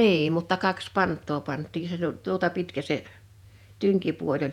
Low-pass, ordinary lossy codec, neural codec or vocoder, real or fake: 19.8 kHz; none; vocoder, 48 kHz, 128 mel bands, Vocos; fake